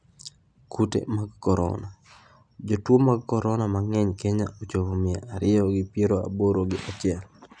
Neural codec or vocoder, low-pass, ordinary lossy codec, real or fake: none; 9.9 kHz; none; real